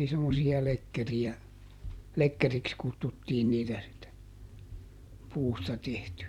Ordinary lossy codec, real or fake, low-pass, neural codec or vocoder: none; fake; 19.8 kHz; vocoder, 44.1 kHz, 128 mel bands every 256 samples, BigVGAN v2